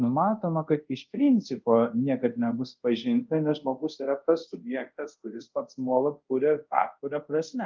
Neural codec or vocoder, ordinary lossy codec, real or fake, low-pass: codec, 24 kHz, 0.5 kbps, DualCodec; Opus, 32 kbps; fake; 7.2 kHz